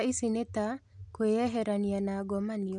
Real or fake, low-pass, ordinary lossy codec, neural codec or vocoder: real; 10.8 kHz; none; none